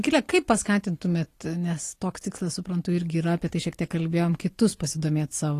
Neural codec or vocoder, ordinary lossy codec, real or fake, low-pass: none; AAC, 48 kbps; real; 14.4 kHz